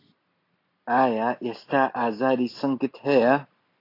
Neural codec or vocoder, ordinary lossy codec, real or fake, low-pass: none; AAC, 32 kbps; real; 5.4 kHz